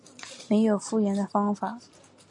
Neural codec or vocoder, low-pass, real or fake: none; 10.8 kHz; real